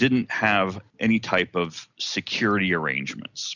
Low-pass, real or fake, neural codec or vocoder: 7.2 kHz; real; none